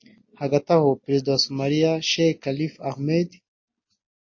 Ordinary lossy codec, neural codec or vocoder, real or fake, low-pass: MP3, 32 kbps; none; real; 7.2 kHz